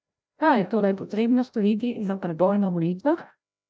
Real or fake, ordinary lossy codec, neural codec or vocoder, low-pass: fake; none; codec, 16 kHz, 0.5 kbps, FreqCodec, larger model; none